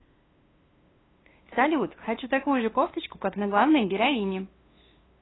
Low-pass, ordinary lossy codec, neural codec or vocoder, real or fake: 7.2 kHz; AAC, 16 kbps; codec, 16 kHz, 2 kbps, FunCodec, trained on LibriTTS, 25 frames a second; fake